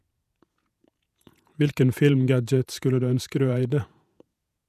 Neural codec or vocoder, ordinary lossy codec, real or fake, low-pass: vocoder, 48 kHz, 128 mel bands, Vocos; none; fake; 14.4 kHz